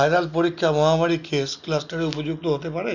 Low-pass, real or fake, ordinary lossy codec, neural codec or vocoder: 7.2 kHz; real; none; none